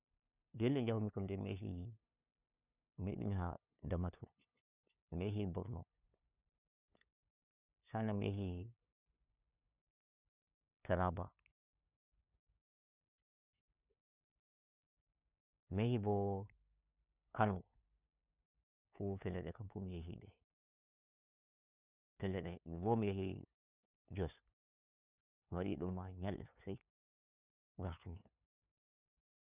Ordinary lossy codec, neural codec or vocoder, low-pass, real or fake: none; codec, 16 kHz, 2 kbps, FunCodec, trained on LibriTTS, 25 frames a second; 3.6 kHz; fake